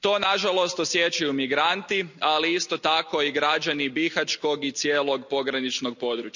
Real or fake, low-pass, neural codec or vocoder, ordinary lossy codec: real; 7.2 kHz; none; none